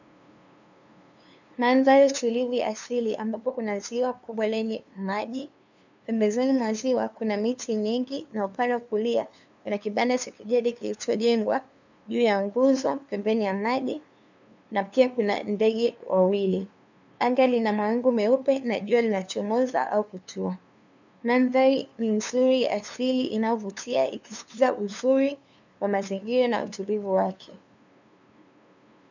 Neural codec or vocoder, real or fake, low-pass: codec, 16 kHz, 2 kbps, FunCodec, trained on LibriTTS, 25 frames a second; fake; 7.2 kHz